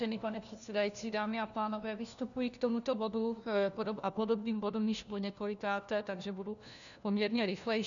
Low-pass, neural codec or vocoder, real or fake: 7.2 kHz; codec, 16 kHz, 1 kbps, FunCodec, trained on LibriTTS, 50 frames a second; fake